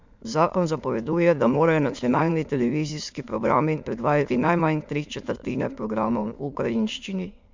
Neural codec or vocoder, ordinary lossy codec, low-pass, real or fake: autoencoder, 22.05 kHz, a latent of 192 numbers a frame, VITS, trained on many speakers; MP3, 64 kbps; 7.2 kHz; fake